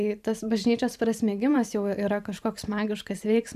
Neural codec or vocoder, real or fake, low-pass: vocoder, 44.1 kHz, 128 mel bands every 512 samples, BigVGAN v2; fake; 14.4 kHz